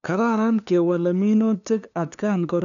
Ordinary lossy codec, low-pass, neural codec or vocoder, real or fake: none; 7.2 kHz; codec, 16 kHz, 2 kbps, FunCodec, trained on LibriTTS, 25 frames a second; fake